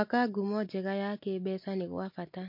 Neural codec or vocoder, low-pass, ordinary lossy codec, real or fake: none; 5.4 kHz; MP3, 32 kbps; real